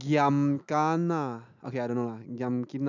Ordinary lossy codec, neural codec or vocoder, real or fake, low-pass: none; none; real; 7.2 kHz